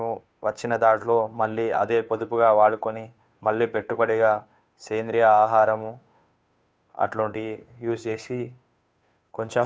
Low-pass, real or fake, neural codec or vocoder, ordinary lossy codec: none; fake; codec, 16 kHz, 2 kbps, FunCodec, trained on Chinese and English, 25 frames a second; none